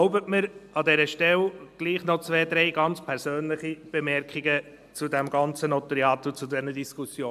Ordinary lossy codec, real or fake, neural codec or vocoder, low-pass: AAC, 96 kbps; real; none; 14.4 kHz